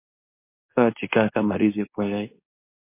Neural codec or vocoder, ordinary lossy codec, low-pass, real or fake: codec, 24 kHz, 0.9 kbps, WavTokenizer, medium speech release version 2; MP3, 24 kbps; 3.6 kHz; fake